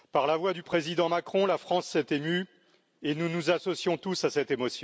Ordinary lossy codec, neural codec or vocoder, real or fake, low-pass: none; none; real; none